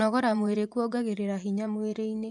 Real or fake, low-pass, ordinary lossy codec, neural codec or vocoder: fake; 10.8 kHz; none; vocoder, 24 kHz, 100 mel bands, Vocos